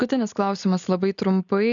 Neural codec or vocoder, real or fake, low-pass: none; real; 7.2 kHz